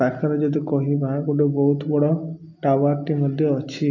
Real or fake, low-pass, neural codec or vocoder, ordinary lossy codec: real; 7.2 kHz; none; MP3, 64 kbps